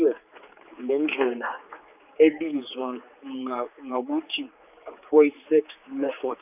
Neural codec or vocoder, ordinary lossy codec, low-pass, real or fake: codec, 16 kHz, 4 kbps, X-Codec, HuBERT features, trained on general audio; none; 3.6 kHz; fake